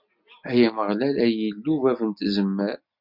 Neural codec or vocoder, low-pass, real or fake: none; 5.4 kHz; real